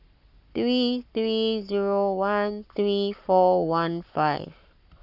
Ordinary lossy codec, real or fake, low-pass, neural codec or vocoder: none; fake; 5.4 kHz; codec, 44.1 kHz, 7.8 kbps, Pupu-Codec